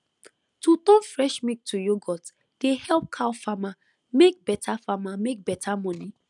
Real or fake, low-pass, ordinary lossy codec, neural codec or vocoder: real; 10.8 kHz; none; none